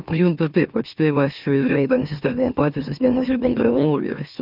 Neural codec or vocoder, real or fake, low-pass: autoencoder, 44.1 kHz, a latent of 192 numbers a frame, MeloTTS; fake; 5.4 kHz